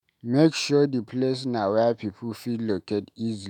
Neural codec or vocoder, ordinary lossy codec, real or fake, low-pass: autoencoder, 48 kHz, 128 numbers a frame, DAC-VAE, trained on Japanese speech; none; fake; 19.8 kHz